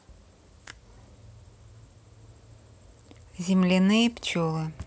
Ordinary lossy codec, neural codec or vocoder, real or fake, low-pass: none; none; real; none